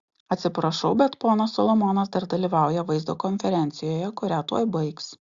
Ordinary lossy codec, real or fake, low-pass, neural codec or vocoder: Opus, 64 kbps; real; 7.2 kHz; none